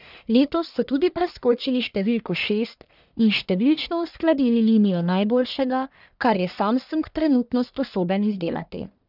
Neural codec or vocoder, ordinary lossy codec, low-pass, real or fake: codec, 44.1 kHz, 1.7 kbps, Pupu-Codec; none; 5.4 kHz; fake